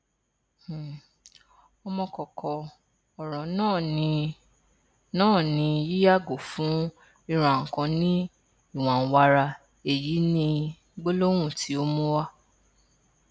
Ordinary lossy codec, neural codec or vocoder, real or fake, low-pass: none; none; real; none